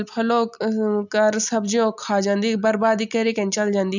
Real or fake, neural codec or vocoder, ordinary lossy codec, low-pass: real; none; none; 7.2 kHz